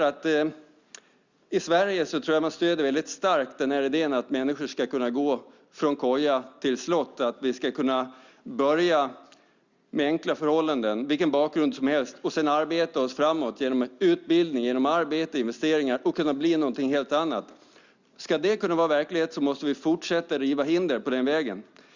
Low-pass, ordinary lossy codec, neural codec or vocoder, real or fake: 7.2 kHz; Opus, 64 kbps; none; real